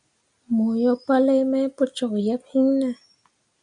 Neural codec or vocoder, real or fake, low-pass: none; real; 9.9 kHz